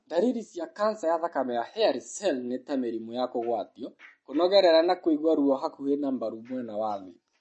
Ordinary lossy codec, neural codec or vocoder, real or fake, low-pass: MP3, 32 kbps; none; real; 10.8 kHz